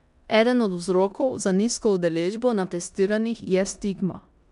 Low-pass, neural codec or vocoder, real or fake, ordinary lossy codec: 10.8 kHz; codec, 16 kHz in and 24 kHz out, 0.9 kbps, LongCat-Audio-Codec, four codebook decoder; fake; none